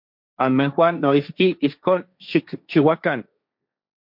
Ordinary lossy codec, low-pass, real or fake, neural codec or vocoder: MP3, 48 kbps; 5.4 kHz; fake; codec, 16 kHz, 1.1 kbps, Voila-Tokenizer